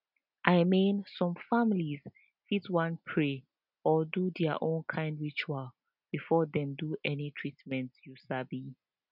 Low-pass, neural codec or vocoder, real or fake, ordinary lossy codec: 5.4 kHz; none; real; none